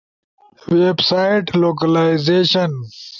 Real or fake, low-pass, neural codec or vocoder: real; 7.2 kHz; none